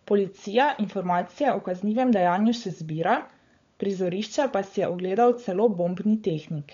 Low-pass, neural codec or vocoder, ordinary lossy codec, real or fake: 7.2 kHz; codec, 16 kHz, 16 kbps, FunCodec, trained on LibriTTS, 50 frames a second; MP3, 48 kbps; fake